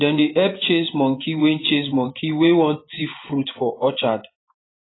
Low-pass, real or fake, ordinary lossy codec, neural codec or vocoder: 7.2 kHz; fake; AAC, 16 kbps; vocoder, 24 kHz, 100 mel bands, Vocos